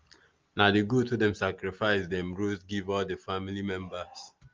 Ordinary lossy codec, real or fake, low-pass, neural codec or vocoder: Opus, 24 kbps; real; 7.2 kHz; none